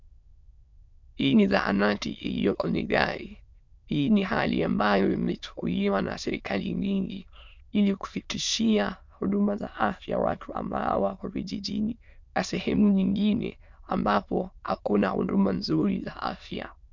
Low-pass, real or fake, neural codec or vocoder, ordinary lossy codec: 7.2 kHz; fake; autoencoder, 22.05 kHz, a latent of 192 numbers a frame, VITS, trained on many speakers; MP3, 64 kbps